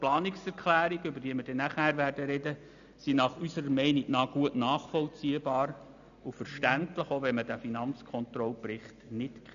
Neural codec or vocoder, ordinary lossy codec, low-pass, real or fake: none; none; 7.2 kHz; real